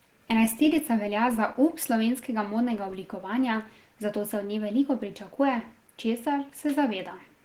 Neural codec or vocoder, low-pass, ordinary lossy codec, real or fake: none; 19.8 kHz; Opus, 16 kbps; real